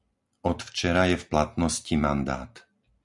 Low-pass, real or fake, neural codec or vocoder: 10.8 kHz; real; none